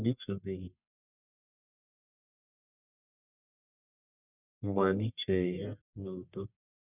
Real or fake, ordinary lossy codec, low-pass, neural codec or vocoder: fake; none; 3.6 kHz; codec, 44.1 kHz, 1.7 kbps, Pupu-Codec